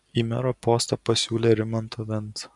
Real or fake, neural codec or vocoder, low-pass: real; none; 10.8 kHz